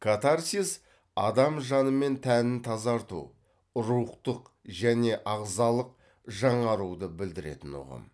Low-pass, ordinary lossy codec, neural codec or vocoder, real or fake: none; none; none; real